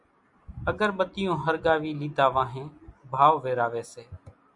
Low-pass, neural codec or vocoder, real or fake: 10.8 kHz; none; real